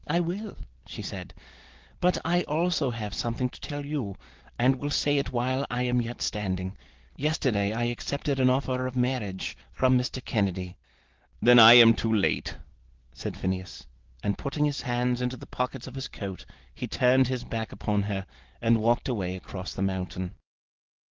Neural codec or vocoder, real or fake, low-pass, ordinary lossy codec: none; real; 7.2 kHz; Opus, 16 kbps